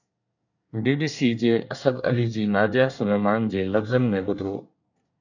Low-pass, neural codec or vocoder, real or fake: 7.2 kHz; codec, 24 kHz, 1 kbps, SNAC; fake